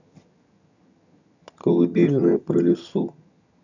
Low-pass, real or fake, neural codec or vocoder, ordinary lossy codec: 7.2 kHz; fake; vocoder, 22.05 kHz, 80 mel bands, HiFi-GAN; none